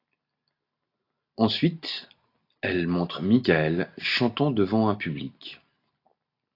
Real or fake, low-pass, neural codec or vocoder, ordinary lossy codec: real; 5.4 kHz; none; AAC, 32 kbps